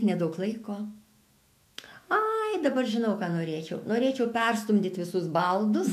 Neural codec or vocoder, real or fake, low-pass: autoencoder, 48 kHz, 128 numbers a frame, DAC-VAE, trained on Japanese speech; fake; 14.4 kHz